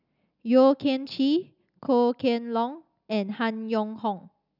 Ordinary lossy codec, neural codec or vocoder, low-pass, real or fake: none; none; 5.4 kHz; real